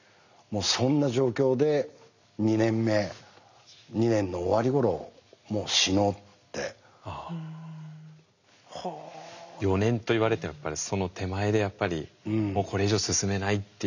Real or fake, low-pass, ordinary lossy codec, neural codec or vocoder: real; 7.2 kHz; none; none